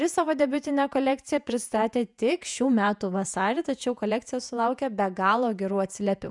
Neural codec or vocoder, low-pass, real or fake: vocoder, 48 kHz, 128 mel bands, Vocos; 10.8 kHz; fake